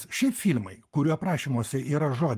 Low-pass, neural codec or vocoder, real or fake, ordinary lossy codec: 14.4 kHz; codec, 44.1 kHz, 7.8 kbps, Pupu-Codec; fake; Opus, 32 kbps